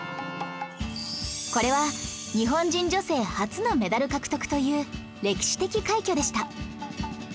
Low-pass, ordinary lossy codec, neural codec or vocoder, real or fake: none; none; none; real